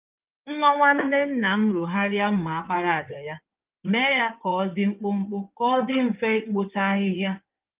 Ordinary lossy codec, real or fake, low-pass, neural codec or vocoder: Opus, 32 kbps; fake; 3.6 kHz; codec, 16 kHz in and 24 kHz out, 2.2 kbps, FireRedTTS-2 codec